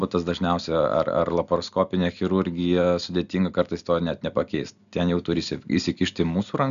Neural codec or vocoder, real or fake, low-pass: none; real; 7.2 kHz